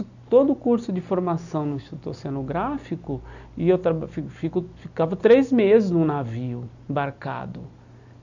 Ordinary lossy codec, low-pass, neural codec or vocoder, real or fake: none; 7.2 kHz; none; real